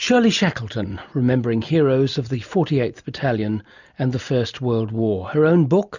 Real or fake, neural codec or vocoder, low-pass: real; none; 7.2 kHz